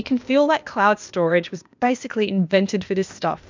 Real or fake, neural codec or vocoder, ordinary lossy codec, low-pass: fake; codec, 16 kHz, 0.8 kbps, ZipCodec; MP3, 64 kbps; 7.2 kHz